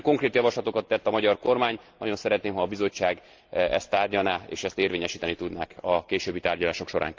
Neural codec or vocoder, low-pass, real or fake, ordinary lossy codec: none; 7.2 kHz; real; Opus, 32 kbps